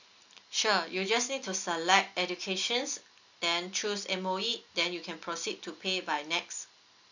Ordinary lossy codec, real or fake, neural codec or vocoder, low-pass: none; real; none; 7.2 kHz